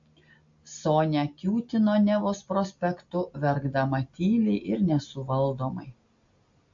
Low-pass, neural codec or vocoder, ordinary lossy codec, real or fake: 7.2 kHz; none; AAC, 64 kbps; real